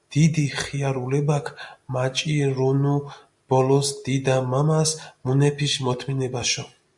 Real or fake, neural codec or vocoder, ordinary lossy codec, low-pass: real; none; MP3, 64 kbps; 10.8 kHz